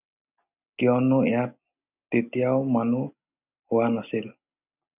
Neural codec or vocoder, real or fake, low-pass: none; real; 3.6 kHz